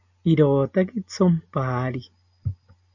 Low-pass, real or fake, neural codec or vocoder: 7.2 kHz; real; none